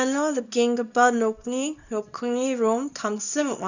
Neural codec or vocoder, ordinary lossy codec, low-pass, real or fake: codec, 24 kHz, 0.9 kbps, WavTokenizer, small release; none; 7.2 kHz; fake